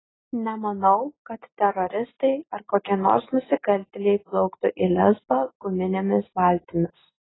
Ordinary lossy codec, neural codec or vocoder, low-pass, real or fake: AAC, 16 kbps; none; 7.2 kHz; real